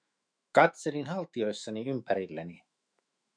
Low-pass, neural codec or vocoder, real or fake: 9.9 kHz; autoencoder, 48 kHz, 128 numbers a frame, DAC-VAE, trained on Japanese speech; fake